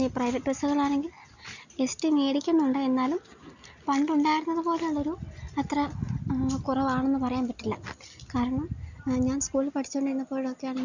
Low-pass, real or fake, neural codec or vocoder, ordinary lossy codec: 7.2 kHz; real; none; none